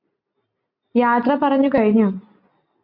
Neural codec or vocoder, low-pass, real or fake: none; 5.4 kHz; real